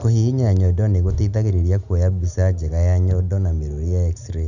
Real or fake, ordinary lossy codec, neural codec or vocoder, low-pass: real; none; none; 7.2 kHz